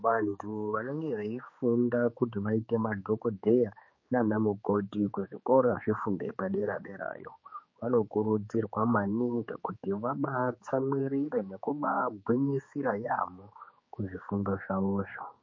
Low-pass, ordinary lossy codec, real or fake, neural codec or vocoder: 7.2 kHz; MP3, 32 kbps; fake; codec, 16 kHz, 4 kbps, X-Codec, HuBERT features, trained on general audio